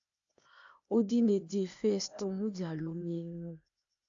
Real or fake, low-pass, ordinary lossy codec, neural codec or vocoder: fake; 7.2 kHz; AAC, 64 kbps; codec, 16 kHz, 0.8 kbps, ZipCodec